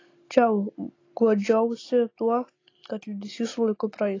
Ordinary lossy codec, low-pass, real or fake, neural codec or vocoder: AAC, 32 kbps; 7.2 kHz; real; none